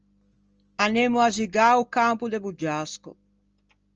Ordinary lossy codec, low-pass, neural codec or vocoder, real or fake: Opus, 24 kbps; 7.2 kHz; none; real